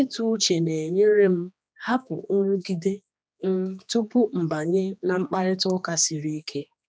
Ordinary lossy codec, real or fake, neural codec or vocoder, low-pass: none; fake; codec, 16 kHz, 2 kbps, X-Codec, HuBERT features, trained on general audio; none